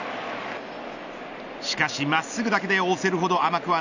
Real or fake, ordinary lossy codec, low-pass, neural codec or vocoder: real; none; 7.2 kHz; none